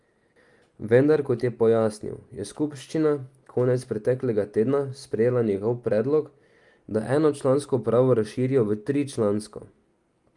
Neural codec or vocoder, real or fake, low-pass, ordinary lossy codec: none; real; 10.8 kHz; Opus, 32 kbps